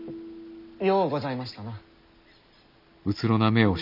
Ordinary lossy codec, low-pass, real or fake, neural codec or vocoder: none; 5.4 kHz; real; none